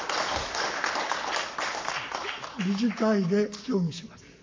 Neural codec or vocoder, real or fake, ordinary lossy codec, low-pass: codec, 44.1 kHz, 7.8 kbps, Pupu-Codec; fake; MP3, 64 kbps; 7.2 kHz